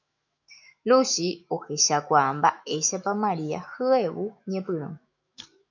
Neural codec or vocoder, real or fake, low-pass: autoencoder, 48 kHz, 128 numbers a frame, DAC-VAE, trained on Japanese speech; fake; 7.2 kHz